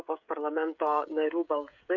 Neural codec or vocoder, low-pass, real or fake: codec, 16 kHz, 16 kbps, FreqCodec, smaller model; 7.2 kHz; fake